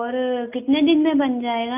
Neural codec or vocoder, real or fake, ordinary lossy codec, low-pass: none; real; none; 3.6 kHz